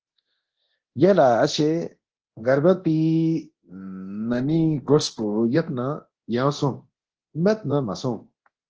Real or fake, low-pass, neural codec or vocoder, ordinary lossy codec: fake; 7.2 kHz; codec, 24 kHz, 0.9 kbps, DualCodec; Opus, 16 kbps